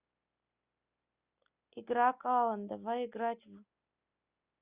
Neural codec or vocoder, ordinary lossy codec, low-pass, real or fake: none; Opus, 64 kbps; 3.6 kHz; real